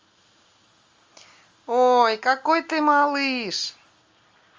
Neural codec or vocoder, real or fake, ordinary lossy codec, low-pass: none; real; Opus, 32 kbps; 7.2 kHz